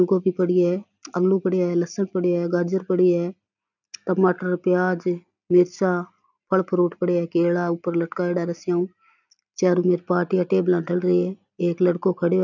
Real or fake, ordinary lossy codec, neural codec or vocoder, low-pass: real; none; none; 7.2 kHz